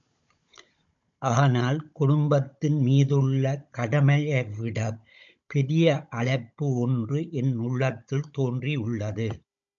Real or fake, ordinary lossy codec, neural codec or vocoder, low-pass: fake; MP3, 64 kbps; codec, 16 kHz, 16 kbps, FunCodec, trained on Chinese and English, 50 frames a second; 7.2 kHz